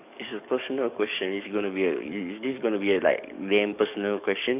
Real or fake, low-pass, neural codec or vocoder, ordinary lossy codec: fake; 3.6 kHz; codec, 44.1 kHz, 7.8 kbps, DAC; none